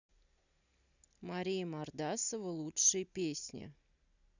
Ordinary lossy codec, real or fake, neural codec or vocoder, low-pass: none; real; none; 7.2 kHz